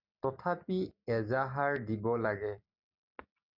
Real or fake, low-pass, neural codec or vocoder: real; 5.4 kHz; none